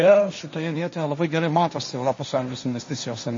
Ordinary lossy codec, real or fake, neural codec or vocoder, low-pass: MP3, 32 kbps; fake; codec, 16 kHz, 1.1 kbps, Voila-Tokenizer; 7.2 kHz